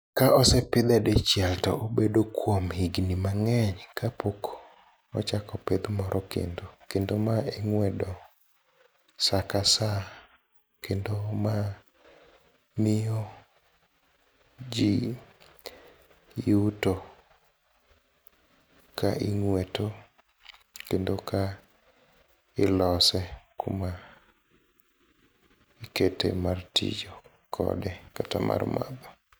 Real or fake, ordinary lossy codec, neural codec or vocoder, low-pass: real; none; none; none